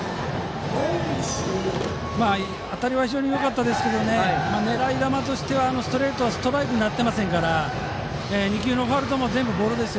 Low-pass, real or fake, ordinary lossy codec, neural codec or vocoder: none; real; none; none